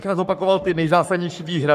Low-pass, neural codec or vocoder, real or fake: 14.4 kHz; codec, 44.1 kHz, 3.4 kbps, Pupu-Codec; fake